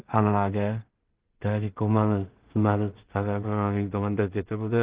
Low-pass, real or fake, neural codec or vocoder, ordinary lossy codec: 3.6 kHz; fake; codec, 16 kHz in and 24 kHz out, 0.4 kbps, LongCat-Audio-Codec, two codebook decoder; Opus, 16 kbps